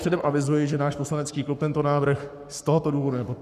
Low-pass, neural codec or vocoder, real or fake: 14.4 kHz; codec, 44.1 kHz, 7.8 kbps, Pupu-Codec; fake